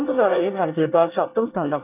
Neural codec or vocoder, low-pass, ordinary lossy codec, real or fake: codec, 24 kHz, 1 kbps, SNAC; 3.6 kHz; none; fake